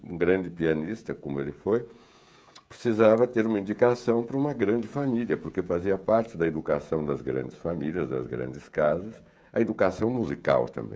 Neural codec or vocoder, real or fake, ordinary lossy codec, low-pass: codec, 16 kHz, 16 kbps, FreqCodec, smaller model; fake; none; none